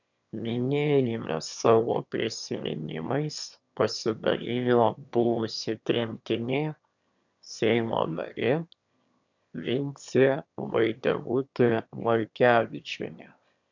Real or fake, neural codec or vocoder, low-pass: fake; autoencoder, 22.05 kHz, a latent of 192 numbers a frame, VITS, trained on one speaker; 7.2 kHz